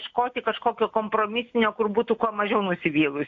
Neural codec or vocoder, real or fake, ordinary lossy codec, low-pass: none; real; AAC, 48 kbps; 7.2 kHz